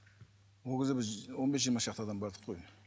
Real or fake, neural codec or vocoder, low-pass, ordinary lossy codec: real; none; none; none